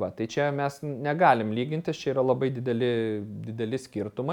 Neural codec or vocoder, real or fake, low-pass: none; real; 19.8 kHz